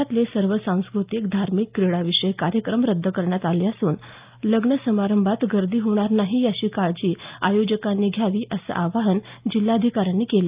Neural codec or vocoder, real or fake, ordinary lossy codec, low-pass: none; real; Opus, 24 kbps; 3.6 kHz